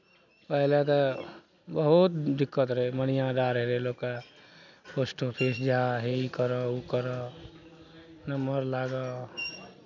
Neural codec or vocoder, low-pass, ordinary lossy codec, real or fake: none; 7.2 kHz; none; real